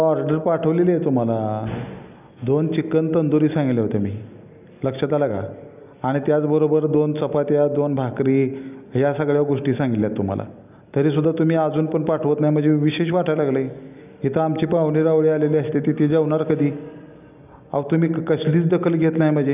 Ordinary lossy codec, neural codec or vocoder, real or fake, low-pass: none; none; real; 3.6 kHz